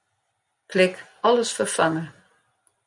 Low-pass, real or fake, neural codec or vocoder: 10.8 kHz; real; none